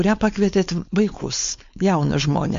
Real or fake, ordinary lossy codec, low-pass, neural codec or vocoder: fake; MP3, 48 kbps; 7.2 kHz; codec, 16 kHz, 4.8 kbps, FACodec